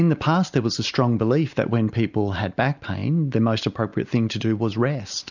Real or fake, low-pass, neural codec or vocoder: real; 7.2 kHz; none